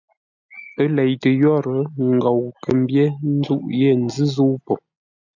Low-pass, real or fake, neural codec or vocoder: 7.2 kHz; real; none